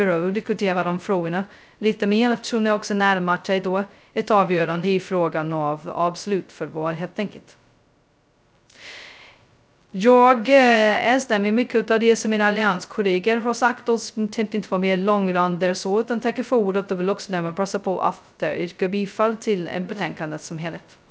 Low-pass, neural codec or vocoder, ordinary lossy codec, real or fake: none; codec, 16 kHz, 0.2 kbps, FocalCodec; none; fake